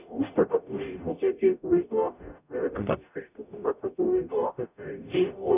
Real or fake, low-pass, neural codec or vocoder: fake; 3.6 kHz; codec, 44.1 kHz, 0.9 kbps, DAC